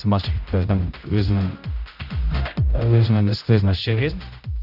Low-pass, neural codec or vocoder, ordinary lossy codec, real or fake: 5.4 kHz; codec, 16 kHz, 0.5 kbps, X-Codec, HuBERT features, trained on general audio; none; fake